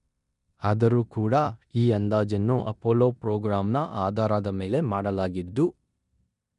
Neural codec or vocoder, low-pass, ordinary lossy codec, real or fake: codec, 16 kHz in and 24 kHz out, 0.9 kbps, LongCat-Audio-Codec, four codebook decoder; 10.8 kHz; none; fake